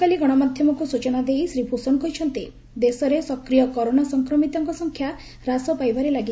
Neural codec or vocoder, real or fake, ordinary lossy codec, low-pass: none; real; none; none